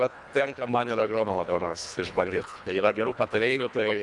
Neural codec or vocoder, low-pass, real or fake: codec, 24 kHz, 1.5 kbps, HILCodec; 10.8 kHz; fake